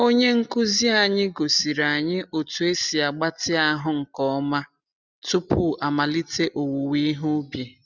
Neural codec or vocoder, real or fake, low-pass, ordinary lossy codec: none; real; 7.2 kHz; none